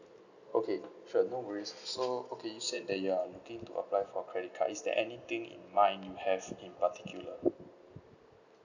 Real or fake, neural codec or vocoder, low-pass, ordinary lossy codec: real; none; 7.2 kHz; none